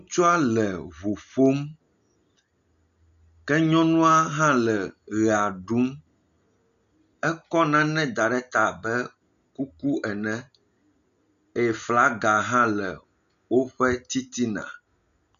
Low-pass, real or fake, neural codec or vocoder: 7.2 kHz; real; none